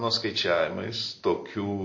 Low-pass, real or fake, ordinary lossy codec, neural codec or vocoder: 7.2 kHz; real; MP3, 32 kbps; none